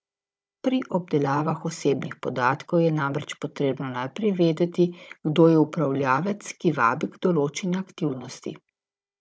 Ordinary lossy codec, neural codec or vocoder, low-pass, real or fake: none; codec, 16 kHz, 16 kbps, FunCodec, trained on Chinese and English, 50 frames a second; none; fake